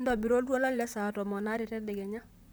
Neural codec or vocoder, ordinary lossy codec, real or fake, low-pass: vocoder, 44.1 kHz, 128 mel bands, Pupu-Vocoder; none; fake; none